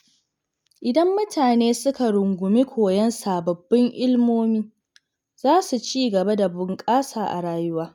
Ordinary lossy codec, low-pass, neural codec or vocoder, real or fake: none; 19.8 kHz; none; real